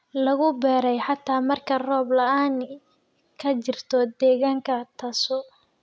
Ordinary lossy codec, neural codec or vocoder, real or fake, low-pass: none; none; real; none